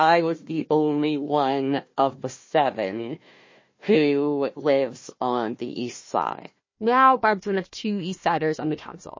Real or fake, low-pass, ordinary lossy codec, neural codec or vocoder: fake; 7.2 kHz; MP3, 32 kbps; codec, 16 kHz, 1 kbps, FunCodec, trained on Chinese and English, 50 frames a second